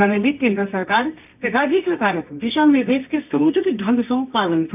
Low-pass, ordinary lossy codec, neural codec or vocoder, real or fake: 3.6 kHz; none; codec, 24 kHz, 0.9 kbps, WavTokenizer, medium music audio release; fake